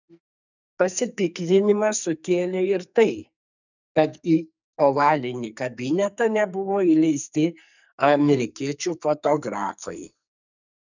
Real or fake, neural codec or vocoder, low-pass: fake; codec, 44.1 kHz, 2.6 kbps, SNAC; 7.2 kHz